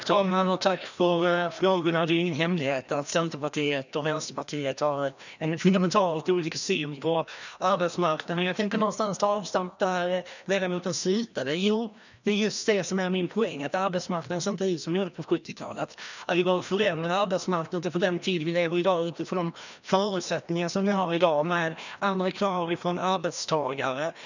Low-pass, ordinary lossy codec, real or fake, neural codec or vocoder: 7.2 kHz; none; fake; codec, 16 kHz, 1 kbps, FreqCodec, larger model